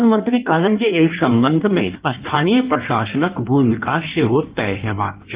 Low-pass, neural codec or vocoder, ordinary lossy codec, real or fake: 3.6 kHz; codec, 16 kHz in and 24 kHz out, 1.1 kbps, FireRedTTS-2 codec; Opus, 32 kbps; fake